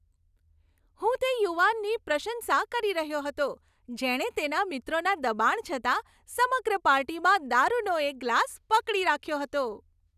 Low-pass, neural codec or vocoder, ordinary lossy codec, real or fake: 14.4 kHz; none; none; real